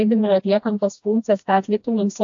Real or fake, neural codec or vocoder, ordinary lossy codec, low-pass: fake; codec, 16 kHz, 1 kbps, FreqCodec, smaller model; AAC, 64 kbps; 7.2 kHz